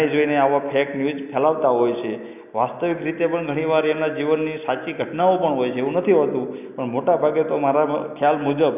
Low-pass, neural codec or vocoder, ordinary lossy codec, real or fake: 3.6 kHz; none; none; real